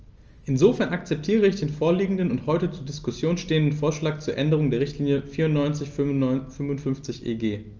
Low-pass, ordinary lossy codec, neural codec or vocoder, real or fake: 7.2 kHz; Opus, 24 kbps; none; real